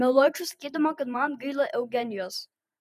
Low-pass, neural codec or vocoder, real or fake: 14.4 kHz; vocoder, 48 kHz, 128 mel bands, Vocos; fake